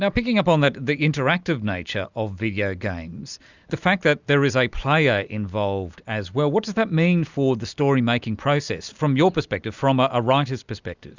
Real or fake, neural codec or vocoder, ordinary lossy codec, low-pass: real; none; Opus, 64 kbps; 7.2 kHz